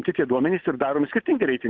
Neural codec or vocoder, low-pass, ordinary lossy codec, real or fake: none; 7.2 kHz; Opus, 32 kbps; real